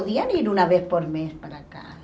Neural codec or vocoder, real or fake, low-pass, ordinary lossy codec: none; real; none; none